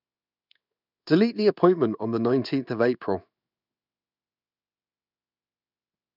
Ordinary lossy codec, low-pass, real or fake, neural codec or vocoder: none; 5.4 kHz; fake; codec, 16 kHz in and 24 kHz out, 1 kbps, XY-Tokenizer